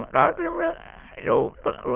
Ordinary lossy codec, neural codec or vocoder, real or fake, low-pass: Opus, 16 kbps; autoencoder, 22.05 kHz, a latent of 192 numbers a frame, VITS, trained on many speakers; fake; 3.6 kHz